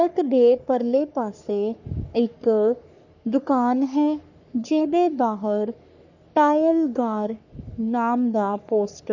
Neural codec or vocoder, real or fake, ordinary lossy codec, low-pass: codec, 44.1 kHz, 3.4 kbps, Pupu-Codec; fake; none; 7.2 kHz